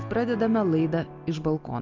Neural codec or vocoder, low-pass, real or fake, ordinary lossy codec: none; 7.2 kHz; real; Opus, 24 kbps